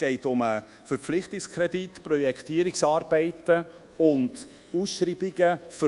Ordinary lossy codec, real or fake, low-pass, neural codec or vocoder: AAC, 96 kbps; fake; 10.8 kHz; codec, 24 kHz, 1.2 kbps, DualCodec